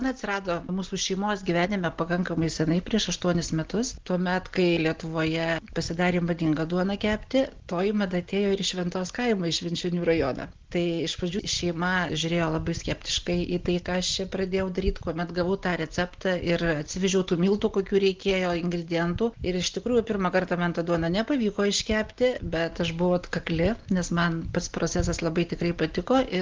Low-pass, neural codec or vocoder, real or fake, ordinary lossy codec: 7.2 kHz; none; real; Opus, 16 kbps